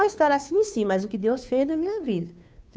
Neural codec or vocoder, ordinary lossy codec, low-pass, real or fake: codec, 16 kHz, 2 kbps, FunCodec, trained on Chinese and English, 25 frames a second; none; none; fake